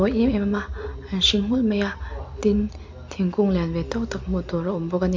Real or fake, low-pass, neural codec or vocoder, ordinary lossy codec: real; 7.2 kHz; none; MP3, 64 kbps